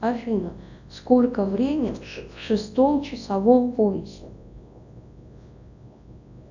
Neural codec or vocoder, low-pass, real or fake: codec, 24 kHz, 0.9 kbps, WavTokenizer, large speech release; 7.2 kHz; fake